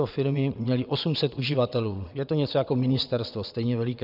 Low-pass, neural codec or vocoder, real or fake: 5.4 kHz; vocoder, 22.05 kHz, 80 mel bands, WaveNeXt; fake